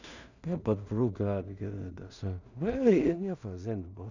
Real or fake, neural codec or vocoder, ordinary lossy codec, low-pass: fake; codec, 16 kHz in and 24 kHz out, 0.4 kbps, LongCat-Audio-Codec, two codebook decoder; none; 7.2 kHz